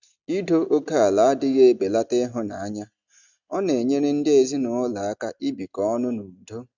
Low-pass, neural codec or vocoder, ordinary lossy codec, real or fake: 7.2 kHz; none; none; real